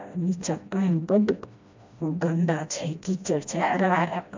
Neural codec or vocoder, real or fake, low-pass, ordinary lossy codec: codec, 16 kHz, 1 kbps, FreqCodec, smaller model; fake; 7.2 kHz; MP3, 64 kbps